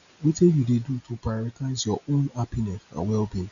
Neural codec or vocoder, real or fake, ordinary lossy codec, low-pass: none; real; none; 7.2 kHz